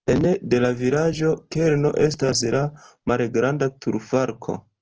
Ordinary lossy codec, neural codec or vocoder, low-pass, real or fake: Opus, 16 kbps; none; 7.2 kHz; real